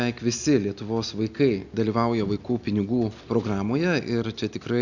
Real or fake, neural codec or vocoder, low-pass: real; none; 7.2 kHz